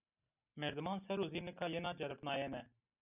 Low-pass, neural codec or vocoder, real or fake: 3.6 kHz; none; real